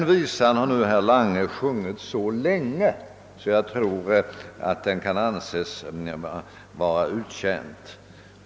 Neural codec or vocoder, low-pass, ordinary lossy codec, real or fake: none; none; none; real